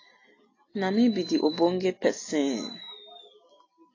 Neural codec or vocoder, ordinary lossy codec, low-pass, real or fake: none; AAC, 32 kbps; 7.2 kHz; real